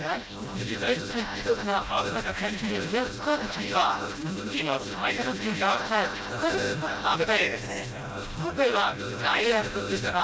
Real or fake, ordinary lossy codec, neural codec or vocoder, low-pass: fake; none; codec, 16 kHz, 0.5 kbps, FreqCodec, smaller model; none